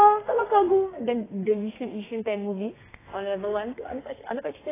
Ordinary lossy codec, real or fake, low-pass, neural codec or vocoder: AAC, 16 kbps; fake; 3.6 kHz; codec, 32 kHz, 1.9 kbps, SNAC